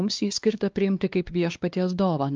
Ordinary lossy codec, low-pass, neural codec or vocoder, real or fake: Opus, 24 kbps; 7.2 kHz; codec, 16 kHz, 2 kbps, X-Codec, WavLM features, trained on Multilingual LibriSpeech; fake